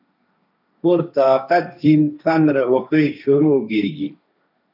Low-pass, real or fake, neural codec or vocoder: 5.4 kHz; fake; codec, 16 kHz, 1.1 kbps, Voila-Tokenizer